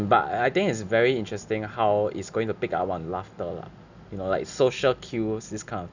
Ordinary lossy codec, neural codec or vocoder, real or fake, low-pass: none; none; real; 7.2 kHz